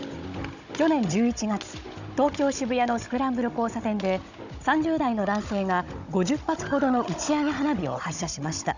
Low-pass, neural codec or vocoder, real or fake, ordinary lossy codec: 7.2 kHz; codec, 16 kHz, 16 kbps, FunCodec, trained on Chinese and English, 50 frames a second; fake; none